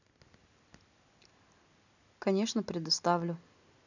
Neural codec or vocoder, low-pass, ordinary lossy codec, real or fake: none; 7.2 kHz; none; real